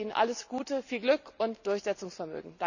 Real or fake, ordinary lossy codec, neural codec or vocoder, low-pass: real; none; none; 7.2 kHz